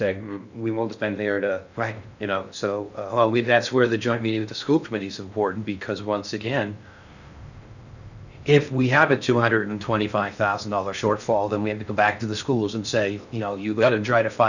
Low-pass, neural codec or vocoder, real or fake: 7.2 kHz; codec, 16 kHz in and 24 kHz out, 0.6 kbps, FocalCodec, streaming, 2048 codes; fake